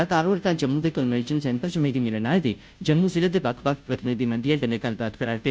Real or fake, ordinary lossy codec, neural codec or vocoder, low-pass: fake; none; codec, 16 kHz, 0.5 kbps, FunCodec, trained on Chinese and English, 25 frames a second; none